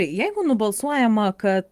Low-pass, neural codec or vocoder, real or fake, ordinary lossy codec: 14.4 kHz; vocoder, 44.1 kHz, 128 mel bands every 512 samples, BigVGAN v2; fake; Opus, 32 kbps